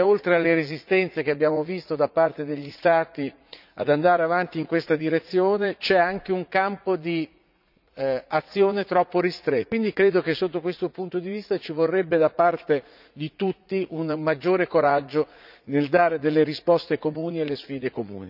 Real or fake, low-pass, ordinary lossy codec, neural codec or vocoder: fake; 5.4 kHz; none; vocoder, 44.1 kHz, 80 mel bands, Vocos